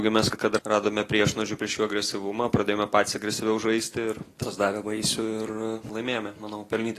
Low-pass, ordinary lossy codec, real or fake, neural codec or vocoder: 14.4 kHz; AAC, 48 kbps; real; none